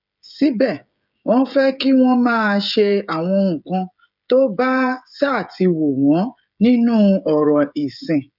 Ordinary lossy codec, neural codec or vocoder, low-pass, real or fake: none; codec, 16 kHz, 16 kbps, FreqCodec, smaller model; 5.4 kHz; fake